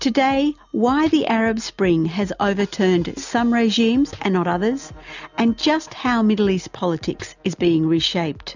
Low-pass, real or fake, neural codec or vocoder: 7.2 kHz; real; none